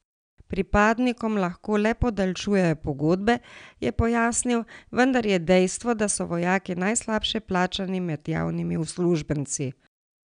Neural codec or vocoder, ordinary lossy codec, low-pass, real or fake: none; none; 9.9 kHz; real